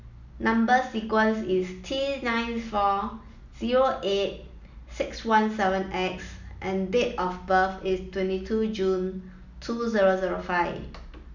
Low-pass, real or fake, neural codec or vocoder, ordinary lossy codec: 7.2 kHz; real; none; none